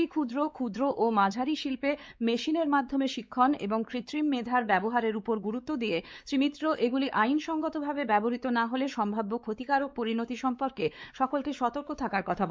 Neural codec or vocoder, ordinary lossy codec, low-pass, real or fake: codec, 16 kHz, 4 kbps, X-Codec, WavLM features, trained on Multilingual LibriSpeech; none; none; fake